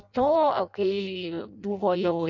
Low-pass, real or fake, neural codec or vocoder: 7.2 kHz; fake; codec, 16 kHz in and 24 kHz out, 0.6 kbps, FireRedTTS-2 codec